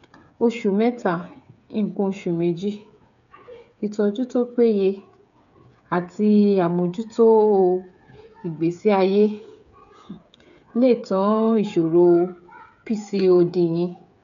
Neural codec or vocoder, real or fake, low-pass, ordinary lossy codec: codec, 16 kHz, 8 kbps, FreqCodec, smaller model; fake; 7.2 kHz; none